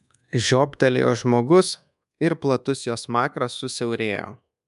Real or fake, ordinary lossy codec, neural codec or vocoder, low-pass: fake; MP3, 96 kbps; codec, 24 kHz, 1.2 kbps, DualCodec; 10.8 kHz